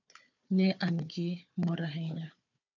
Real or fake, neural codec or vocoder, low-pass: fake; codec, 16 kHz, 4 kbps, FunCodec, trained on Chinese and English, 50 frames a second; 7.2 kHz